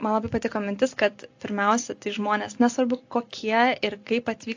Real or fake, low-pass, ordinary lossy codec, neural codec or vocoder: real; 7.2 kHz; AAC, 48 kbps; none